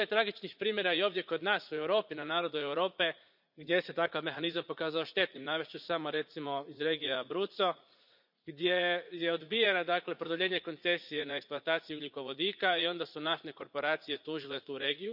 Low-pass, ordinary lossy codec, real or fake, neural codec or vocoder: 5.4 kHz; none; fake; vocoder, 44.1 kHz, 80 mel bands, Vocos